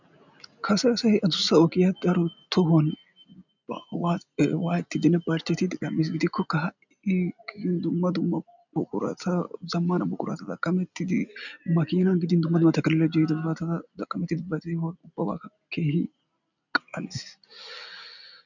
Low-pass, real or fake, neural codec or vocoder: 7.2 kHz; real; none